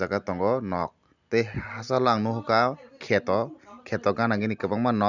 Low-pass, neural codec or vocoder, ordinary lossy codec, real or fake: 7.2 kHz; none; none; real